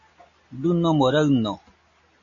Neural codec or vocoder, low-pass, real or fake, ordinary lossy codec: none; 7.2 kHz; real; MP3, 32 kbps